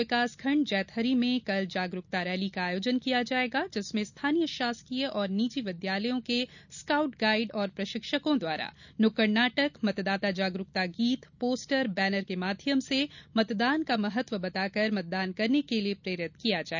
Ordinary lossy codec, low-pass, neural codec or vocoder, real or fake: none; 7.2 kHz; none; real